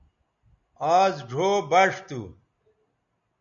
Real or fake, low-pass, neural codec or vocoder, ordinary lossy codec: real; 7.2 kHz; none; MP3, 96 kbps